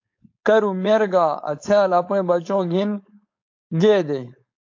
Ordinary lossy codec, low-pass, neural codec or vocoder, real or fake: AAC, 48 kbps; 7.2 kHz; codec, 16 kHz, 4.8 kbps, FACodec; fake